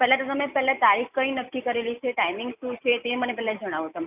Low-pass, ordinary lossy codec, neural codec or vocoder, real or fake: 3.6 kHz; none; none; real